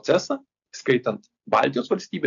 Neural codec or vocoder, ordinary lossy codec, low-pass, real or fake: none; AAC, 64 kbps; 7.2 kHz; real